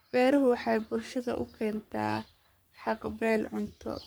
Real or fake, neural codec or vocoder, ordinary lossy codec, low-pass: fake; codec, 44.1 kHz, 3.4 kbps, Pupu-Codec; none; none